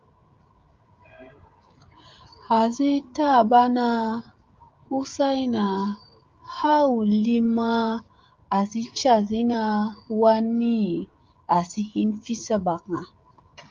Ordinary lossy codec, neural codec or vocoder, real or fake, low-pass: Opus, 32 kbps; codec, 16 kHz, 16 kbps, FreqCodec, smaller model; fake; 7.2 kHz